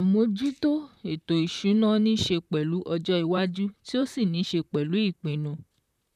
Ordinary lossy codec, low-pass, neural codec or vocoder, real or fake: none; 14.4 kHz; vocoder, 44.1 kHz, 128 mel bands, Pupu-Vocoder; fake